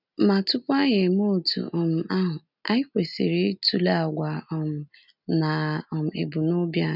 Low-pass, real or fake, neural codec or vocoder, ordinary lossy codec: 5.4 kHz; real; none; none